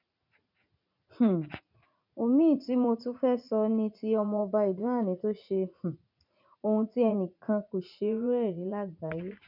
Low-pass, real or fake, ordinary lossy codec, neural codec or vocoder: 5.4 kHz; fake; none; vocoder, 44.1 kHz, 80 mel bands, Vocos